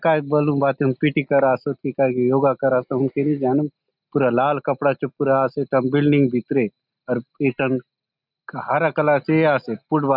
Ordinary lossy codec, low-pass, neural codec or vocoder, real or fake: none; 5.4 kHz; none; real